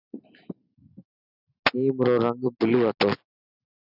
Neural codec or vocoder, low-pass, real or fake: none; 5.4 kHz; real